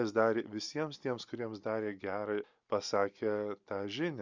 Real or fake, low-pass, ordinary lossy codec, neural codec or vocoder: real; 7.2 kHz; Opus, 64 kbps; none